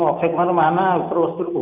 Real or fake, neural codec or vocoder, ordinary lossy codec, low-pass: real; none; none; 3.6 kHz